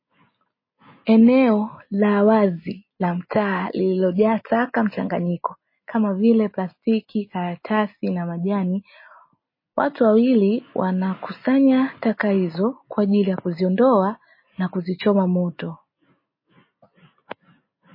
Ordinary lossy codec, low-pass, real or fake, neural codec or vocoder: MP3, 24 kbps; 5.4 kHz; real; none